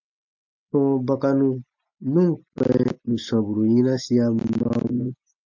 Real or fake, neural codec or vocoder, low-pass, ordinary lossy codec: real; none; 7.2 kHz; MP3, 48 kbps